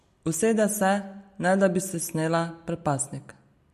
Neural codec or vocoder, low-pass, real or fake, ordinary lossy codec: none; 14.4 kHz; real; MP3, 64 kbps